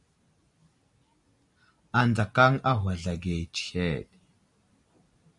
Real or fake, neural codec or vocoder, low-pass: real; none; 10.8 kHz